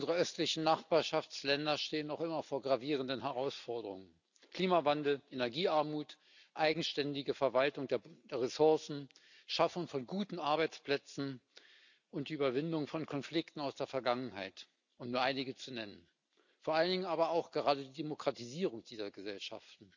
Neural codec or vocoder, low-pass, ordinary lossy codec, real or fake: none; 7.2 kHz; none; real